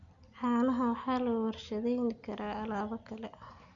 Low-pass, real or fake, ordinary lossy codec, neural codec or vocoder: 7.2 kHz; real; none; none